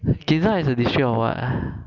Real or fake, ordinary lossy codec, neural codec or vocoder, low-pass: real; none; none; 7.2 kHz